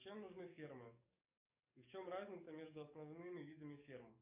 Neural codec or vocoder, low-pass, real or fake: codec, 44.1 kHz, 7.8 kbps, DAC; 3.6 kHz; fake